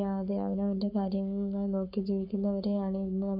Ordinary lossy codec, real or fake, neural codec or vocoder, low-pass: none; fake; codec, 44.1 kHz, 7.8 kbps, Pupu-Codec; 5.4 kHz